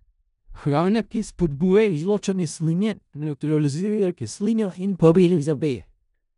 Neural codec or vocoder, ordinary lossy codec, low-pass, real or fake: codec, 16 kHz in and 24 kHz out, 0.4 kbps, LongCat-Audio-Codec, four codebook decoder; none; 10.8 kHz; fake